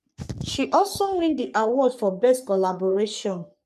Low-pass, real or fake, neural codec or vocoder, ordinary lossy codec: 14.4 kHz; fake; codec, 44.1 kHz, 3.4 kbps, Pupu-Codec; MP3, 96 kbps